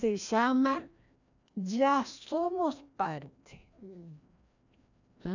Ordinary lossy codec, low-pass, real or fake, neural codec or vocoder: none; 7.2 kHz; fake; codec, 16 kHz, 1 kbps, FreqCodec, larger model